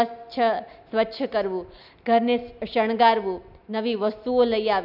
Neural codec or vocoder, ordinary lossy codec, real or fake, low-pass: none; none; real; 5.4 kHz